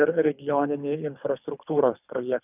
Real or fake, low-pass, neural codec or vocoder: fake; 3.6 kHz; codec, 24 kHz, 3 kbps, HILCodec